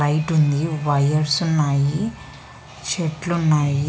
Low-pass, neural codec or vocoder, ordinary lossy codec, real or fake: none; none; none; real